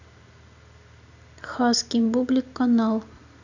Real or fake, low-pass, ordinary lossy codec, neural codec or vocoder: real; 7.2 kHz; none; none